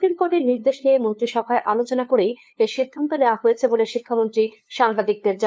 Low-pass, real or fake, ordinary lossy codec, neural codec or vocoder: none; fake; none; codec, 16 kHz, 2 kbps, FunCodec, trained on LibriTTS, 25 frames a second